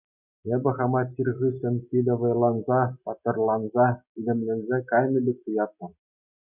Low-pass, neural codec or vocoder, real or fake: 3.6 kHz; none; real